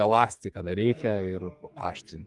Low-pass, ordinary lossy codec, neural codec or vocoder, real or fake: 10.8 kHz; Opus, 32 kbps; codec, 44.1 kHz, 2.6 kbps, SNAC; fake